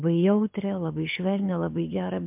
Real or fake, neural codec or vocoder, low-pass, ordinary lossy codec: fake; codec, 24 kHz, 6 kbps, HILCodec; 3.6 kHz; MP3, 32 kbps